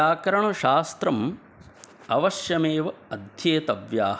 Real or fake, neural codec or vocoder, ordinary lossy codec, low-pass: real; none; none; none